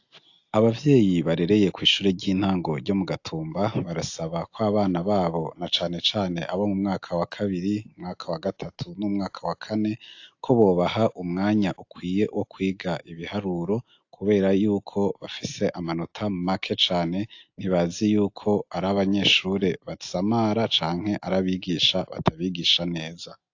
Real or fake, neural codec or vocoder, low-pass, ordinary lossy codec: real; none; 7.2 kHz; AAC, 48 kbps